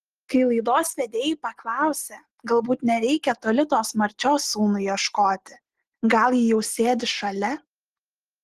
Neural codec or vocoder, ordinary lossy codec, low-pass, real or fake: none; Opus, 16 kbps; 14.4 kHz; real